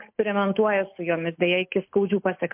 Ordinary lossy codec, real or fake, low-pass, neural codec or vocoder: MP3, 32 kbps; real; 3.6 kHz; none